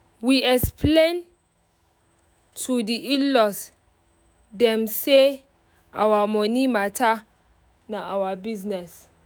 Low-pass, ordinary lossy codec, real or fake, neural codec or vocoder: none; none; fake; autoencoder, 48 kHz, 128 numbers a frame, DAC-VAE, trained on Japanese speech